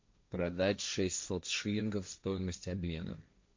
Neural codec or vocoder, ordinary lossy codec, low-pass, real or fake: codec, 16 kHz, 1.1 kbps, Voila-Tokenizer; MP3, 48 kbps; 7.2 kHz; fake